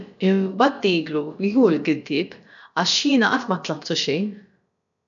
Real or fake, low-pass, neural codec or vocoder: fake; 7.2 kHz; codec, 16 kHz, about 1 kbps, DyCAST, with the encoder's durations